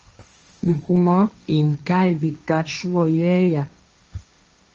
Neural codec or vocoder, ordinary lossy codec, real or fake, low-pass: codec, 16 kHz, 1.1 kbps, Voila-Tokenizer; Opus, 24 kbps; fake; 7.2 kHz